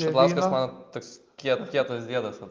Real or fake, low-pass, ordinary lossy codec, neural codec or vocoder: real; 7.2 kHz; Opus, 24 kbps; none